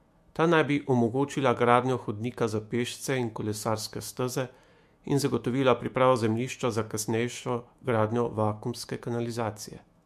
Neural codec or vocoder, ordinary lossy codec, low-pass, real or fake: autoencoder, 48 kHz, 128 numbers a frame, DAC-VAE, trained on Japanese speech; MP3, 64 kbps; 14.4 kHz; fake